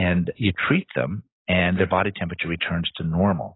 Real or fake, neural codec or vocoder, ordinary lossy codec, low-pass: real; none; AAC, 16 kbps; 7.2 kHz